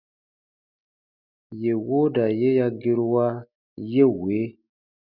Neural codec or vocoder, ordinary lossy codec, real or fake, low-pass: none; Opus, 64 kbps; real; 5.4 kHz